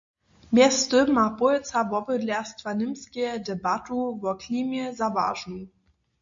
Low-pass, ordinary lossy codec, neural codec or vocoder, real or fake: 7.2 kHz; MP3, 48 kbps; none; real